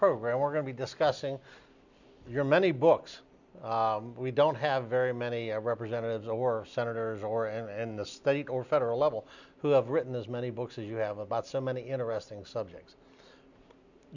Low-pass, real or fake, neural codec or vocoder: 7.2 kHz; real; none